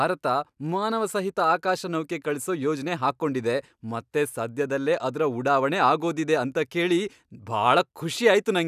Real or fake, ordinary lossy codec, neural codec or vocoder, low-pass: real; none; none; 14.4 kHz